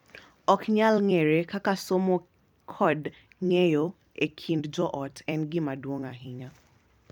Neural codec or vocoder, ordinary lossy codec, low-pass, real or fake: vocoder, 44.1 kHz, 128 mel bands every 256 samples, BigVGAN v2; MP3, 96 kbps; 19.8 kHz; fake